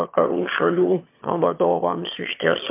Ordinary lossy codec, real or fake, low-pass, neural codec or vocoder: MP3, 32 kbps; fake; 3.6 kHz; autoencoder, 22.05 kHz, a latent of 192 numbers a frame, VITS, trained on one speaker